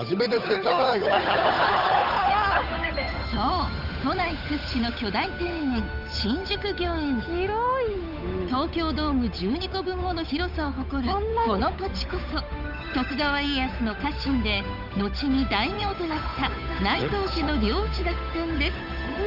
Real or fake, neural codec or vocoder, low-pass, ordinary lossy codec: fake; codec, 16 kHz, 8 kbps, FunCodec, trained on Chinese and English, 25 frames a second; 5.4 kHz; none